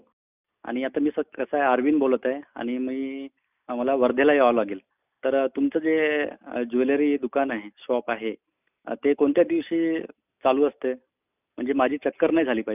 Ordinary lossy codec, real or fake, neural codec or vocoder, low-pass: none; real; none; 3.6 kHz